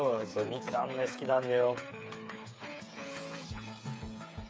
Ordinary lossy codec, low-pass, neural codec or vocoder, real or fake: none; none; codec, 16 kHz, 8 kbps, FreqCodec, smaller model; fake